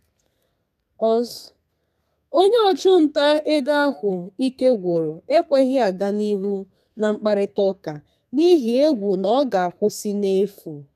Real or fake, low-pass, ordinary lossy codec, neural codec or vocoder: fake; 14.4 kHz; none; codec, 32 kHz, 1.9 kbps, SNAC